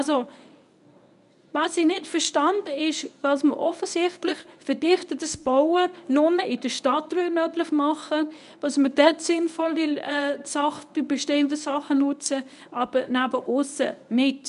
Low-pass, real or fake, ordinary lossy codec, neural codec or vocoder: 10.8 kHz; fake; none; codec, 24 kHz, 0.9 kbps, WavTokenizer, medium speech release version 1